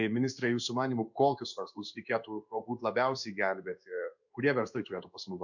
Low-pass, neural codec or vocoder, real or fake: 7.2 kHz; codec, 16 kHz in and 24 kHz out, 1 kbps, XY-Tokenizer; fake